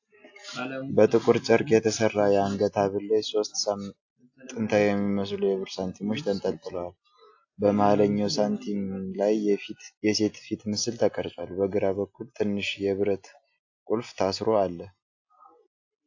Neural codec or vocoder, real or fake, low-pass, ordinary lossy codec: none; real; 7.2 kHz; AAC, 48 kbps